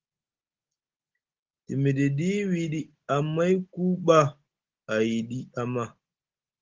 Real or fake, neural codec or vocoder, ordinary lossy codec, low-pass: real; none; Opus, 16 kbps; 7.2 kHz